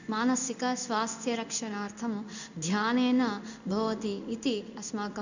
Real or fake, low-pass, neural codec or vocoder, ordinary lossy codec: fake; 7.2 kHz; codec, 16 kHz in and 24 kHz out, 1 kbps, XY-Tokenizer; none